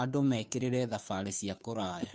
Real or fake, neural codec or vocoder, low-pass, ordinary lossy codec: fake; codec, 16 kHz, 2 kbps, FunCodec, trained on Chinese and English, 25 frames a second; none; none